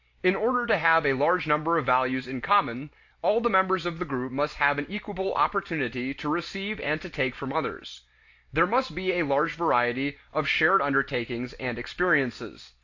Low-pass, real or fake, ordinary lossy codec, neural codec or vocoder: 7.2 kHz; real; AAC, 48 kbps; none